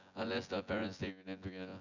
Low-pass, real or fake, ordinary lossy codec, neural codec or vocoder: 7.2 kHz; fake; none; vocoder, 24 kHz, 100 mel bands, Vocos